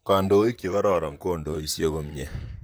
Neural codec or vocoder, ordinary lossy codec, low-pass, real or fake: vocoder, 44.1 kHz, 128 mel bands, Pupu-Vocoder; none; none; fake